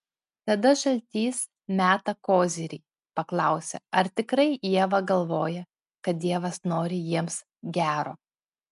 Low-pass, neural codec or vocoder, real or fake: 10.8 kHz; none; real